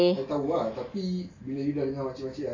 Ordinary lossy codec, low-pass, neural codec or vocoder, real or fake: AAC, 32 kbps; 7.2 kHz; none; real